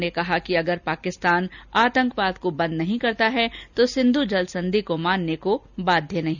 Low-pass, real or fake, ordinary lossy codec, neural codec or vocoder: 7.2 kHz; real; none; none